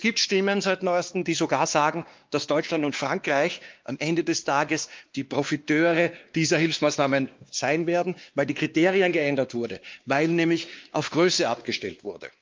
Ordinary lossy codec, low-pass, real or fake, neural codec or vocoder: Opus, 24 kbps; 7.2 kHz; fake; codec, 16 kHz, 2 kbps, X-Codec, WavLM features, trained on Multilingual LibriSpeech